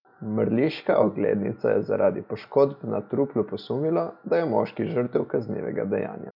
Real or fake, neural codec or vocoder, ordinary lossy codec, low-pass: real; none; none; 5.4 kHz